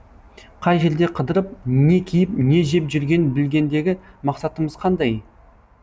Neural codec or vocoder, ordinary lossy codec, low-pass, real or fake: none; none; none; real